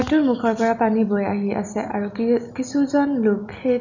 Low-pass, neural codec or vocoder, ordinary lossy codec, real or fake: 7.2 kHz; none; none; real